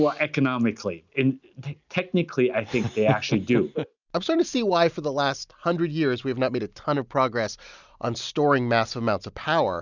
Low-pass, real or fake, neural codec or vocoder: 7.2 kHz; real; none